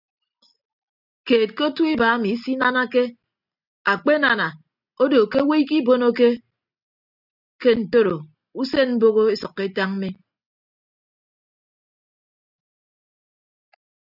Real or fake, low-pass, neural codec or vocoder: real; 5.4 kHz; none